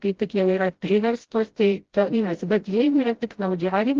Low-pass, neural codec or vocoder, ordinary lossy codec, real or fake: 7.2 kHz; codec, 16 kHz, 0.5 kbps, FreqCodec, smaller model; Opus, 16 kbps; fake